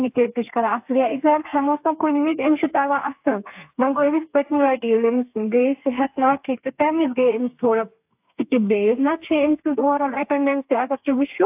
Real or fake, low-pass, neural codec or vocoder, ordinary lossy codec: fake; 3.6 kHz; codec, 32 kHz, 1.9 kbps, SNAC; AAC, 32 kbps